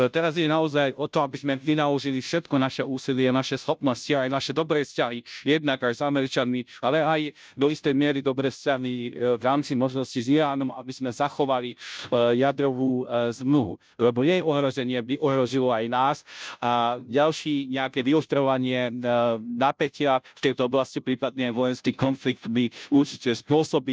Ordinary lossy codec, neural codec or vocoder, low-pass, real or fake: none; codec, 16 kHz, 0.5 kbps, FunCodec, trained on Chinese and English, 25 frames a second; none; fake